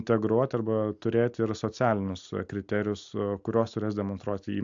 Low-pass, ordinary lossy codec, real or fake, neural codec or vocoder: 7.2 kHz; AAC, 64 kbps; real; none